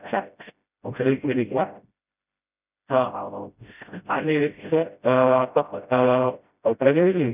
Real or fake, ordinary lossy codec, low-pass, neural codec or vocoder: fake; none; 3.6 kHz; codec, 16 kHz, 0.5 kbps, FreqCodec, smaller model